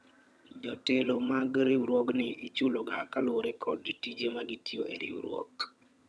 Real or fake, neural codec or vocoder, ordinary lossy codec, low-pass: fake; vocoder, 22.05 kHz, 80 mel bands, HiFi-GAN; none; none